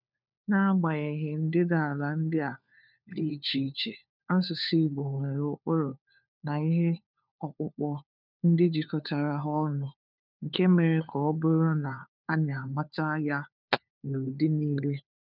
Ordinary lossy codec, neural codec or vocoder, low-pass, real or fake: none; codec, 16 kHz, 4 kbps, FunCodec, trained on LibriTTS, 50 frames a second; 5.4 kHz; fake